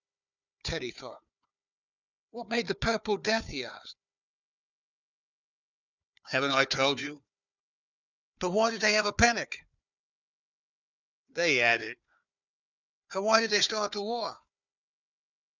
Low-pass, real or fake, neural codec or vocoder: 7.2 kHz; fake; codec, 16 kHz, 4 kbps, FunCodec, trained on Chinese and English, 50 frames a second